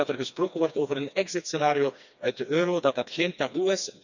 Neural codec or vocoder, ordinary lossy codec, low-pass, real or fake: codec, 16 kHz, 2 kbps, FreqCodec, smaller model; none; 7.2 kHz; fake